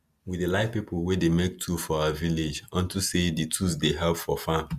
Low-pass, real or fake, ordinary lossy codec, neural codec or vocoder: 14.4 kHz; real; Opus, 64 kbps; none